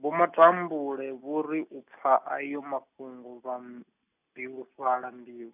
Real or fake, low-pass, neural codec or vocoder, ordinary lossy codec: real; 3.6 kHz; none; none